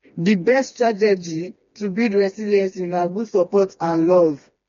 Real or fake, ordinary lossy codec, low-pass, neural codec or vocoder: fake; MP3, 48 kbps; 7.2 kHz; codec, 16 kHz, 2 kbps, FreqCodec, smaller model